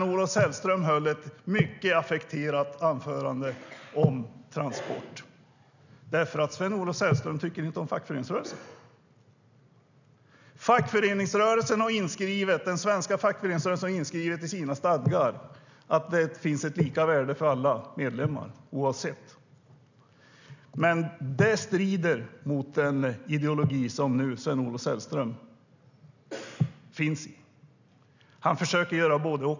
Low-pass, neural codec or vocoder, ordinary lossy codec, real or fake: 7.2 kHz; none; none; real